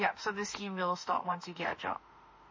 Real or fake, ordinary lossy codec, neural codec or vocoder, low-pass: fake; MP3, 32 kbps; autoencoder, 48 kHz, 32 numbers a frame, DAC-VAE, trained on Japanese speech; 7.2 kHz